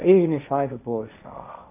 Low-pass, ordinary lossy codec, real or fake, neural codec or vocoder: 3.6 kHz; none; fake; codec, 16 kHz, 1.1 kbps, Voila-Tokenizer